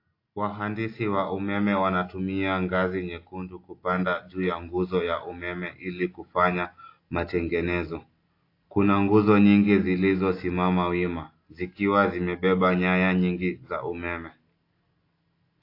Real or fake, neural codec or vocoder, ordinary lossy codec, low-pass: real; none; AAC, 32 kbps; 5.4 kHz